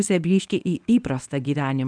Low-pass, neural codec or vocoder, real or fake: 9.9 kHz; codec, 24 kHz, 0.9 kbps, WavTokenizer, medium speech release version 1; fake